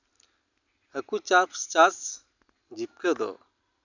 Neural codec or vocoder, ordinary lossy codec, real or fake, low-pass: none; none; real; 7.2 kHz